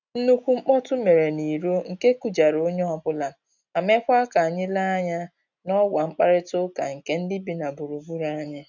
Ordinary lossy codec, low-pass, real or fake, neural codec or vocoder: none; 7.2 kHz; real; none